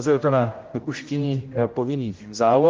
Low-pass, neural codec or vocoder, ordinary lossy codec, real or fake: 7.2 kHz; codec, 16 kHz, 0.5 kbps, X-Codec, HuBERT features, trained on general audio; Opus, 32 kbps; fake